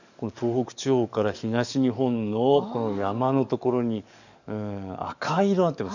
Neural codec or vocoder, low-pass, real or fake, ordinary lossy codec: codec, 44.1 kHz, 7.8 kbps, DAC; 7.2 kHz; fake; none